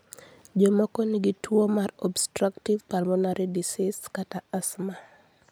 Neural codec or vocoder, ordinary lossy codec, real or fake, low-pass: none; none; real; none